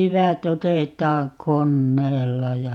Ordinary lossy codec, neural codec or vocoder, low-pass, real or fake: none; vocoder, 48 kHz, 128 mel bands, Vocos; 19.8 kHz; fake